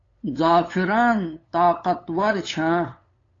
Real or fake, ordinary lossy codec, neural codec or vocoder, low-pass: fake; AAC, 32 kbps; codec, 16 kHz, 8 kbps, FunCodec, trained on LibriTTS, 25 frames a second; 7.2 kHz